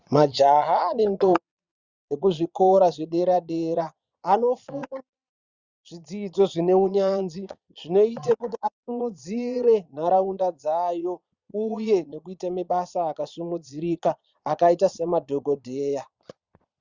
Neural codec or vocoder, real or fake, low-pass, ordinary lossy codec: vocoder, 22.05 kHz, 80 mel bands, Vocos; fake; 7.2 kHz; Opus, 64 kbps